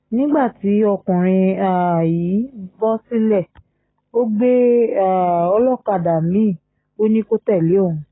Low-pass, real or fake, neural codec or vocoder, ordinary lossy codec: 7.2 kHz; real; none; AAC, 16 kbps